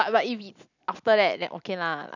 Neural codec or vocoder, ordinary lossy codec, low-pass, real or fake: none; none; 7.2 kHz; real